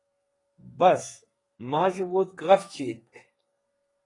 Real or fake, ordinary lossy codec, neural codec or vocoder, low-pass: fake; AAC, 32 kbps; codec, 32 kHz, 1.9 kbps, SNAC; 10.8 kHz